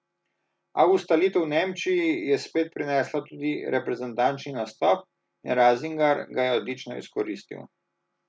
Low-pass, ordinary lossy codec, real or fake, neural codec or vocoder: none; none; real; none